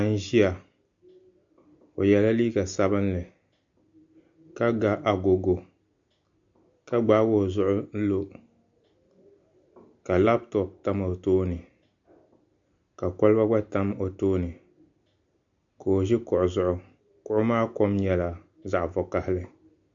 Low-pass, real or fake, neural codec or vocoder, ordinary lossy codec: 7.2 kHz; real; none; MP3, 64 kbps